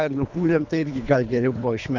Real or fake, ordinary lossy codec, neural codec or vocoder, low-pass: fake; MP3, 64 kbps; codec, 24 kHz, 3 kbps, HILCodec; 7.2 kHz